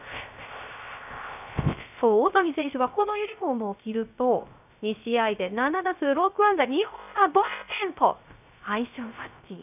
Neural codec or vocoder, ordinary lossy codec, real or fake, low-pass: codec, 16 kHz, 0.3 kbps, FocalCodec; none; fake; 3.6 kHz